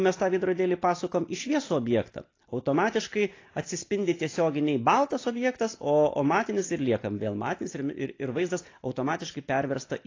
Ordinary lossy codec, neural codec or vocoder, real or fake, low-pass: AAC, 32 kbps; none; real; 7.2 kHz